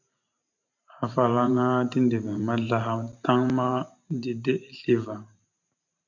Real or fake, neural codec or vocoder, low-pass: fake; vocoder, 44.1 kHz, 128 mel bands every 512 samples, BigVGAN v2; 7.2 kHz